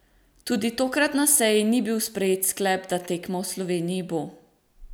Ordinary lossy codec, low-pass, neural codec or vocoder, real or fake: none; none; none; real